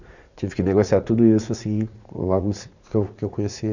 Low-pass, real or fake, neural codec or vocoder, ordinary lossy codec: 7.2 kHz; fake; vocoder, 44.1 kHz, 80 mel bands, Vocos; none